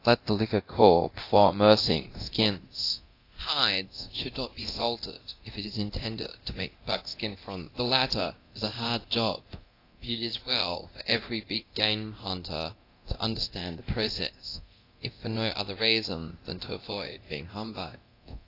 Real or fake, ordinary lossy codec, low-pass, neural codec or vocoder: fake; AAC, 32 kbps; 5.4 kHz; codec, 24 kHz, 0.9 kbps, DualCodec